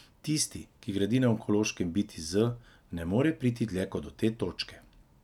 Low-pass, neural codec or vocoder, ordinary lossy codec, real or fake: 19.8 kHz; none; none; real